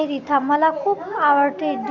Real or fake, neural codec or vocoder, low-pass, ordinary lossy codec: real; none; 7.2 kHz; none